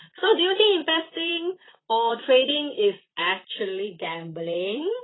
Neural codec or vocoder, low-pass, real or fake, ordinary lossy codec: vocoder, 44.1 kHz, 128 mel bands, Pupu-Vocoder; 7.2 kHz; fake; AAC, 16 kbps